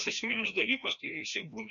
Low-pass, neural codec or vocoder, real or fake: 7.2 kHz; codec, 16 kHz, 1 kbps, FreqCodec, larger model; fake